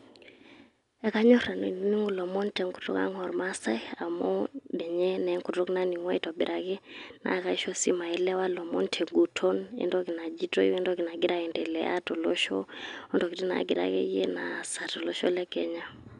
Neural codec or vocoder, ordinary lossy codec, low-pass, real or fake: none; none; 10.8 kHz; real